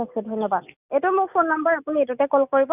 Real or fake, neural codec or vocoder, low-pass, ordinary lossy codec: real; none; 3.6 kHz; none